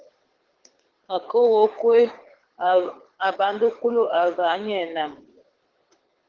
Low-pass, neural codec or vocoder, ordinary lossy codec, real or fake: 7.2 kHz; codec, 24 kHz, 6 kbps, HILCodec; Opus, 16 kbps; fake